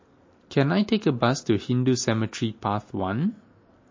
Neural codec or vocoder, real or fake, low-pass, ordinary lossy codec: none; real; 7.2 kHz; MP3, 32 kbps